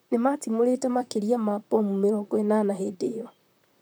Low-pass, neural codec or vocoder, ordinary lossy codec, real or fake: none; vocoder, 44.1 kHz, 128 mel bands, Pupu-Vocoder; none; fake